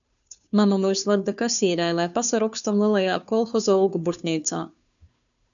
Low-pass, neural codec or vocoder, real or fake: 7.2 kHz; codec, 16 kHz, 2 kbps, FunCodec, trained on Chinese and English, 25 frames a second; fake